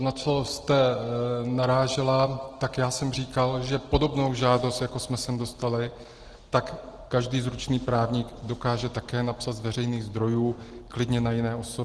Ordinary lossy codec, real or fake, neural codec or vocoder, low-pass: Opus, 16 kbps; real; none; 10.8 kHz